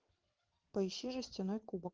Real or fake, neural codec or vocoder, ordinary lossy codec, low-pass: fake; vocoder, 22.05 kHz, 80 mel bands, WaveNeXt; Opus, 32 kbps; 7.2 kHz